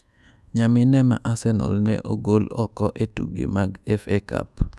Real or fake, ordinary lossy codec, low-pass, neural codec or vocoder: fake; none; none; codec, 24 kHz, 1.2 kbps, DualCodec